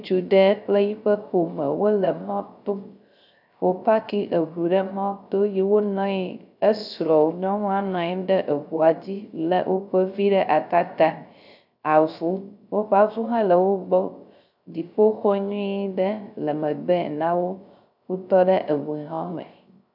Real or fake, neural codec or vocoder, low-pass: fake; codec, 16 kHz, 0.3 kbps, FocalCodec; 5.4 kHz